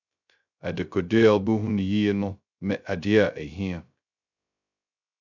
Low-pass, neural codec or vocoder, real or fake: 7.2 kHz; codec, 16 kHz, 0.2 kbps, FocalCodec; fake